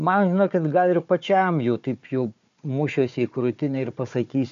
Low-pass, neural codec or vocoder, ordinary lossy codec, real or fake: 7.2 kHz; codec, 16 kHz, 4 kbps, FunCodec, trained on Chinese and English, 50 frames a second; MP3, 64 kbps; fake